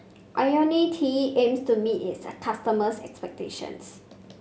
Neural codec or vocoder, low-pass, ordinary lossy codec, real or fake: none; none; none; real